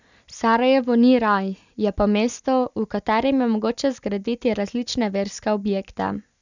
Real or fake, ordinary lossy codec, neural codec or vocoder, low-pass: real; none; none; 7.2 kHz